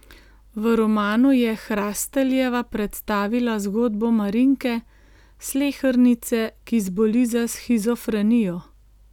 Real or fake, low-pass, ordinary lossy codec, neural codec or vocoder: real; 19.8 kHz; none; none